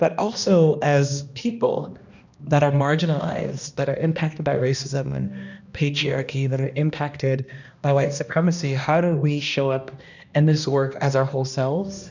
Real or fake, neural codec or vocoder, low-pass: fake; codec, 16 kHz, 1 kbps, X-Codec, HuBERT features, trained on balanced general audio; 7.2 kHz